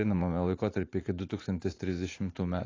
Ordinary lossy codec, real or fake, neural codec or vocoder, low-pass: AAC, 32 kbps; fake; vocoder, 44.1 kHz, 80 mel bands, Vocos; 7.2 kHz